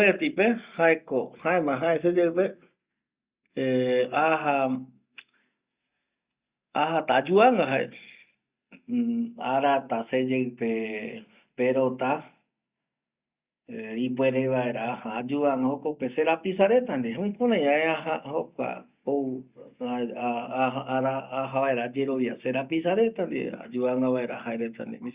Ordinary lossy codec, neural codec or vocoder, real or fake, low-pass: Opus, 64 kbps; none; real; 3.6 kHz